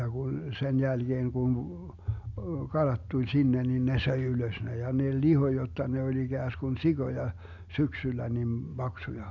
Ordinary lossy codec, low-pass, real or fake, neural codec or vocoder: none; 7.2 kHz; real; none